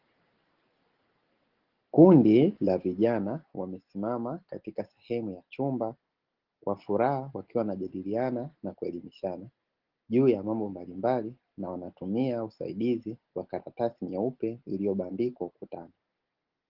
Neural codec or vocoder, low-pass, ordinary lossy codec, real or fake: none; 5.4 kHz; Opus, 16 kbps; real